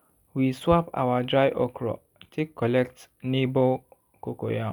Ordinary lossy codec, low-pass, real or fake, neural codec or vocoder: none; 19.8 kHz; real; none